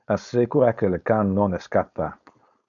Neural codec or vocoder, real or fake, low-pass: codec, 16 kHz, 4.8 kbps, FACodec; fake; 7.2 kHz